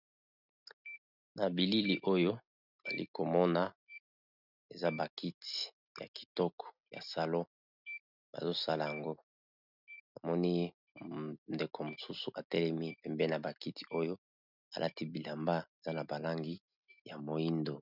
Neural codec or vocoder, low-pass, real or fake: none; 5.4 kHz; real